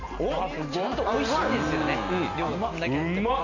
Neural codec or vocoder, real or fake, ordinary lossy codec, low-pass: none; real; none; 7.2 kHz